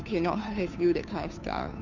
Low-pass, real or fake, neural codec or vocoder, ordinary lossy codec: 7.2 kHz; fake; codec, 16 kHz, 2 kbps, FunCodec, trained on Chinese and English, 25 frames a second; none